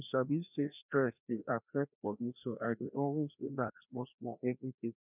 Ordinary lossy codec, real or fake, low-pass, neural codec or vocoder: none; fake; 3.6 kHz; codec, 16 kHz, 1 kbps, FreqCodec, larger model